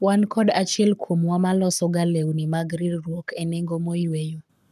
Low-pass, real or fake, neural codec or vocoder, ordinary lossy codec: 14.4 kHz; fake; codec, 44.1 kHz, 7.8 kbps, Pupu-Codec; none